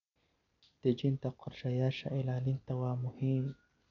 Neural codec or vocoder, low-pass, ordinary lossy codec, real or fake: none; 7.2 kHz; none; real